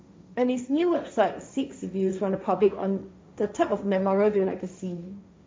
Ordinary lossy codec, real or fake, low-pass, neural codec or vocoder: none; fake; none; codec, 16 kHz, 1.1 kbps, Voila-Tokenizer